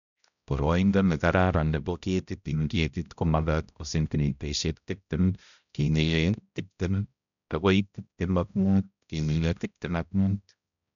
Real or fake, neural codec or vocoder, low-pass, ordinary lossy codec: fake; codec, 16 kHz, 0.5 kbps, X-Codec, HuBERT features, trained on balanced general audio; 7.2 kHz; none